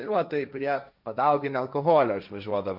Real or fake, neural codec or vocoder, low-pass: fake; codec, 16 kHz, 1.1 kbps, Voila-Tokenizer; 5.4 kHz